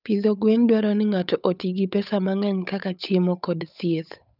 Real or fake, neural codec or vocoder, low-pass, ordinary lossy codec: fake; codec, 16 kHz, 8 kbps, FunCodec, trained on Chinese and English, 25 frames a second; 5.4 kHz; none